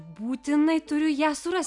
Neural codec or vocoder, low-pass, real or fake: none; 10.8 kHz; real